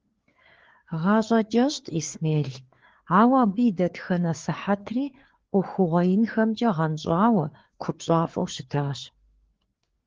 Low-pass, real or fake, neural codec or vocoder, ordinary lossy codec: 7.2 kHz; fake; codec, 16 kHz, 4 kbps, FreqCodec, larger model; Opus, 16 kbps